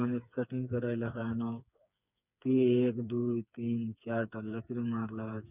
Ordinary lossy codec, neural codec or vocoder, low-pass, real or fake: none; codec, 16 kHz, 4 kbps, FreqCodec, smaller model; 3.6 kHz; fake